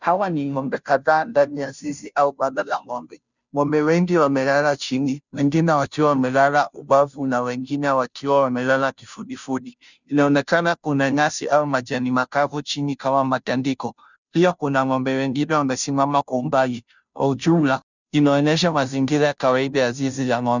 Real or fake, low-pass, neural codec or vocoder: fake; 7.2 kHz; codec, 16 kHz, 0.5 kbps, FunCodec, trained on Chinese and English, 25 frames a second